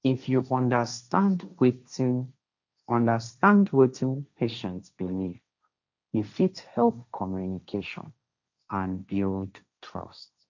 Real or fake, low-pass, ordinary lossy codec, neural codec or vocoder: fake; 7.2 kHz; none; codec, 16 kHz, 1.1 kbps, Voila-Tokenizer